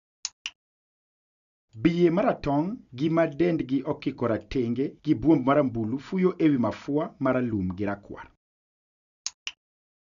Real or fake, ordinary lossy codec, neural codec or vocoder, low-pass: real; none; none; 7.2 kHz